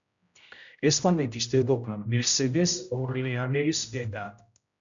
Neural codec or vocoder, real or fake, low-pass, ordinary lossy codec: codec, 16 kHz, 0.5 kbps, X-Codec, HuBERT features, trained on general audio; fake; 7.2 kHz; MP3, 96 kbps